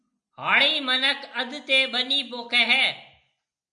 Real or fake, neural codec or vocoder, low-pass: real; none; 9.9 kHz